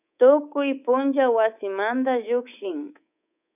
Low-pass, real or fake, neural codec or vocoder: 3.6 kHz; fake; codec, 24 kHz, 3.1 kbps, DualCodec